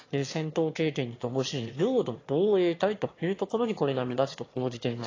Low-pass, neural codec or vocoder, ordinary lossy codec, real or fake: 7.2 kHz; autoencoder, 22.05 kHz, a latent of 192 numbers a frame, VITS, trained on one speaker; AAC, 32 kbps; fake